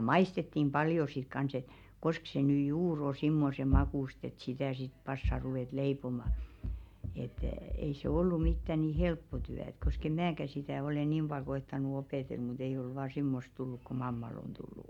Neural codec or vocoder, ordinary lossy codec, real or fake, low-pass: none; MP3, 96 kbps; real; 19.8 kHz